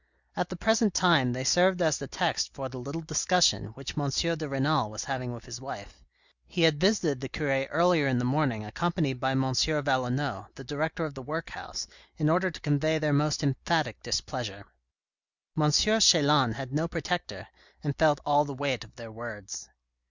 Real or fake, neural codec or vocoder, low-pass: real; none; 7.2 kHz